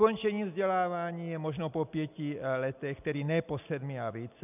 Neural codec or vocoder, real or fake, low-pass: none; real; 3.6 kHz